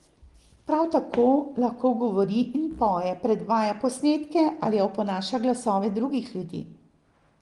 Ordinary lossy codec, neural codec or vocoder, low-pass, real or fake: Opus, 24 kbps; none; 10.8 kHz; real